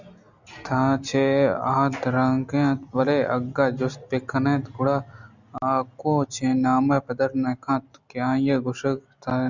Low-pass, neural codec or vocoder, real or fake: 7.2 kHz; none; real